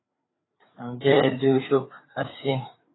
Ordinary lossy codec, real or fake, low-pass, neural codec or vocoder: AAC, 16 kbps; fake; 7.2 kHz; codec, 16 kHz, 4 kbps, FreqCodec, larger model